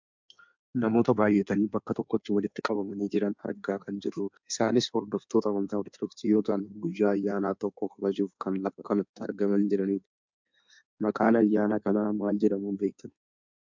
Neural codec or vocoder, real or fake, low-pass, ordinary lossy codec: codec, 16 kHz in and 24 kHz out, 1.1 kbps, FireRedTTS-2 codec; fake; 7.2 kHz; MP3, 64 kbps